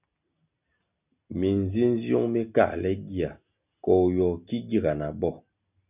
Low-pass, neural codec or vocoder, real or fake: 3.6 kHz; none; real